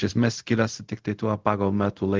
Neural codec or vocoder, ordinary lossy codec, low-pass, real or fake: codec, 16 kHz, 0.4 kbps, LongCat-Audio-Codec; Opus, 16 kbps; 7.2 kHz; fake